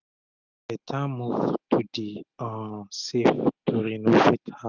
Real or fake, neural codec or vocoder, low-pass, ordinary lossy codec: real; none; 7.2 kHz; Opus, 64 kbps